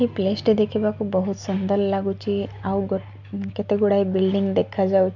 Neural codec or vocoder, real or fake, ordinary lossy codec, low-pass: none; real; MP3, 64 kbps; 7.2 kHz